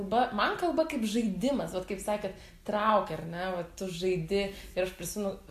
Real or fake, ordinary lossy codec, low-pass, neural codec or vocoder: real; AAC, 64 kbps; 14.4 kHz; none